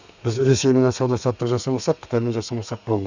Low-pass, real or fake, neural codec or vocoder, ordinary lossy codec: 7.2 kHz; fake; codec, 32 kHz, 1.9 kbps, SNAC; none